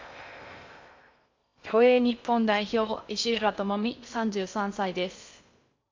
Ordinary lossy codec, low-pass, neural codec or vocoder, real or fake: MP3, 64 kbps; 7.2 kHz; codec, 16 kHz in and 24 kHz out, 0.6 kbps, FocalCodec, streaming, 2048 codes; fake